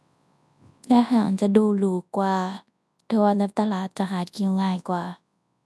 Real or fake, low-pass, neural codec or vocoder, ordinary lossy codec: fake; none; codec, 24 kHz, 0.9 kbps, WavTokenizer, large speech release; none